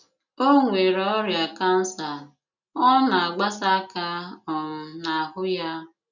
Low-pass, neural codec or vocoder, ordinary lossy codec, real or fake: 7.2 kHz; none; none; real